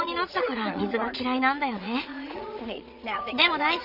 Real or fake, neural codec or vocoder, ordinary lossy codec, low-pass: fake; vocoder, 22.05 kHz, 80 mel bands, Vocos; none; 5.4 kHz